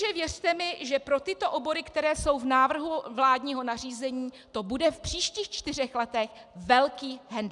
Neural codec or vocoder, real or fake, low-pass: none; real; 10.8 kHz